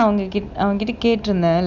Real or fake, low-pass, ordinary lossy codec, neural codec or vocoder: real; 7.2 kHz; none; none